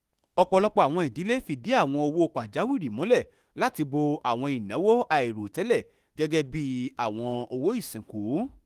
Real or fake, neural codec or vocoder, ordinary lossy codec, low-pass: fake; autoencoder, 48 kHz, 32 numbers a frame, DAC-VAE, trained on Japanese speech; Opus, 24 kbps; 14.4 kHz